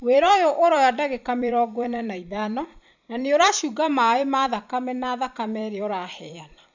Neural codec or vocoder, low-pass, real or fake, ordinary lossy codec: vocoder, 44.1 kHz, 128 mel bands every 256 samples, BigVGAN v2; 7.2 kHz; fake; none